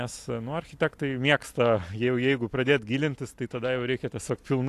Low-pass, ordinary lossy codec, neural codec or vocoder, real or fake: 14.4 kHz; AAC, 64 kbps; none; real